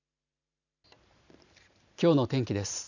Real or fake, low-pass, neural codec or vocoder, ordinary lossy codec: fake; 7.2 kHz; vocoder, 44.1 kHz, 128 mel bands every 512 samples, BigVGAN v2; none